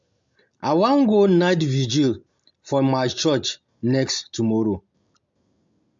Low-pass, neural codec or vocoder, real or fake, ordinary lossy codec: 7.2 kHz; none; real; MP3, 48 kbps